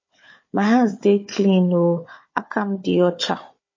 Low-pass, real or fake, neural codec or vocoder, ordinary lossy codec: 7.2 kHz; fake; codec, 16 kHz, 4 kbps, FunCodec, trained on Chinese and English, 50 frames a second; MP3, 32 kbps